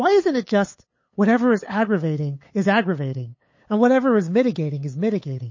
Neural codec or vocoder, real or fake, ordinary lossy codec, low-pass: codec, 16 kHz, 8 kbps, FunCodec, trained on LibriTTS, 25 frames a second; fake; MP3, 32 kbps; 7.2 kHz